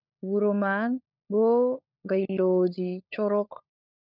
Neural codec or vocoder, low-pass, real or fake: codec, 16 kHz, 16 kbps, FunCodec, trained on LibriTTS, 50 frames a second; 5.4 kHz; fake